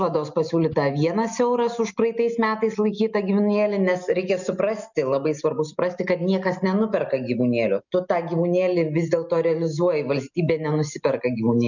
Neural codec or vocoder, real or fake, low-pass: none; real; 7.2 kHz